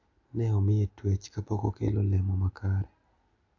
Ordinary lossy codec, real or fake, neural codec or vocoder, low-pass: AAC, 48 kbps; real; none; 7.2 kHz